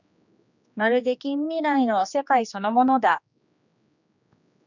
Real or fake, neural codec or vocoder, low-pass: fake; codec, 16 kHz, 2 kbps, X-Codec, HuBERT features, trained on general audio; 7.2 kHz